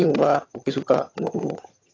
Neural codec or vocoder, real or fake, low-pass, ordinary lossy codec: vocoder, 22.05 kHz, 80 mel bands, HiFi-GAN; fake; 7.2 kHz; AAC, 32 kbps